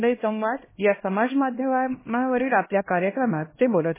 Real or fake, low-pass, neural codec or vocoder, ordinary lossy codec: fake; 3.6 kHz; codec, 16 kHz, 1 kbps, X-Codec, HuBERT features, trained on LibriSpeech; MP3, 16 kbps